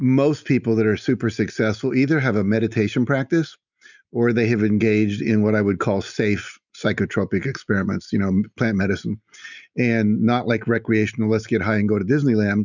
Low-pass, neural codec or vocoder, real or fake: 7.2 kHz; none; real